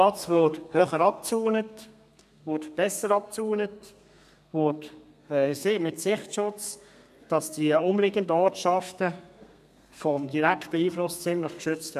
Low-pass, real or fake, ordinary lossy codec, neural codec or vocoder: 14.4 kHz; fake; none; codec, 32 kHz, 1.9 kbps, SNAC